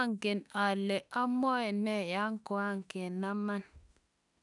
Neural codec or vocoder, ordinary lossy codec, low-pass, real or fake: autoencoder, 48 kHz, 32 numbers a frame, DAC-VAE, trained on Japanese speech; none; 10.8 kHz; fake